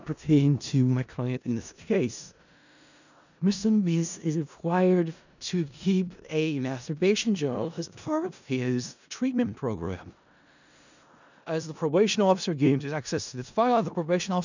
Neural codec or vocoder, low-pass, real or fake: codec, 16 kHz in and 24 kHz out, 0.4 kbps, LongCat-Audio-Codec, four codebook decoder; 7.2 kHz; fake